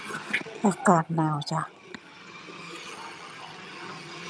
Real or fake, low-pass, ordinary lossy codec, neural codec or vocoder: fake; none; none; vocoder, 22.05 kHz, 80 mel bands, HiFi-GAN